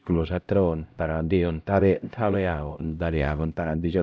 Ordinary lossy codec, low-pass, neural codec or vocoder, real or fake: none; none; codec, 16 kHz, 0.5 kbps, X-Codec, HuBERT features, trained on LibriSpeech; fake